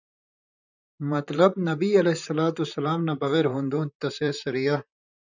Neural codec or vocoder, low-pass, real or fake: vocoder, 44.1 kHz, 128 mel bands, Pupu-Vocoder; 7.2 kHz; fake